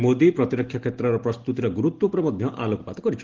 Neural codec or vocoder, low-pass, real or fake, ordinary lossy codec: none; 7.2 kHz; real; Opus, 16 kbps